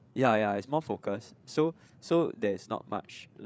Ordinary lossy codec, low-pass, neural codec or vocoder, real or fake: none; none; codec, 16 kHz, 8 kbps, FreqCodec, larger model; fake